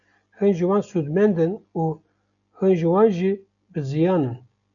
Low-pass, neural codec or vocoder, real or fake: 7.2 kHz; none; real